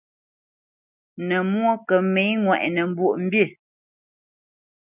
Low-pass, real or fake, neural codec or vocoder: 3.6 kHz; real; none